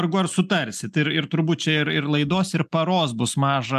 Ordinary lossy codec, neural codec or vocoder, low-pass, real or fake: AAC, 96 kbps; none; 14.4 kHz; real